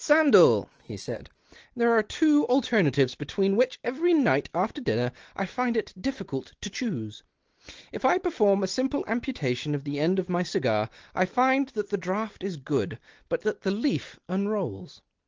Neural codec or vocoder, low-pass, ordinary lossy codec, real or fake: none; 7.2 kHz; Opus, 16 kbps; real